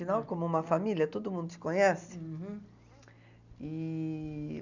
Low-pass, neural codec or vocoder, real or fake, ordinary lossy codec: 7.2 kHz; none; real; none